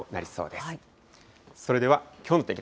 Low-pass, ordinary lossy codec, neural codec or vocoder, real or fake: none; none; none; real